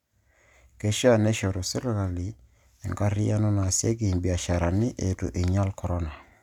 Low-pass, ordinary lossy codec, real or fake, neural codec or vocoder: 19.8 kHz; none; real; none